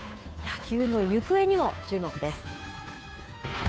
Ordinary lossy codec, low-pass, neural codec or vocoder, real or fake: none; none; codec, 16 kHz, 2 kbps, FunCodec, trained on Chinese and English, 25 frames a second; fake